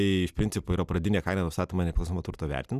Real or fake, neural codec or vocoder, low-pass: real; none; 14.4 kHz